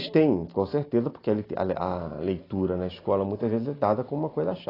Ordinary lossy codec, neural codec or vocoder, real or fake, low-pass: AAC, 24 kbps; none; real; 5.4 kHz